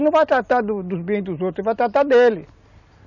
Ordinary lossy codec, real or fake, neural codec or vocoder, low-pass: none; real; none; 7.2 kHz